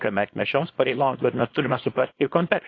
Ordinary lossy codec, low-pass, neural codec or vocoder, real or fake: AAC, 32 kbps; 7.2 kHz; codec, 24 kHz, 0.9 kbps, WavTokenizer, small release; fake